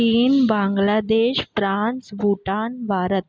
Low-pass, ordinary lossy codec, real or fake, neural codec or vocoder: none; none; real; none